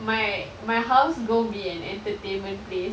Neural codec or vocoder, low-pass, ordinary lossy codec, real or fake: none; none; none; real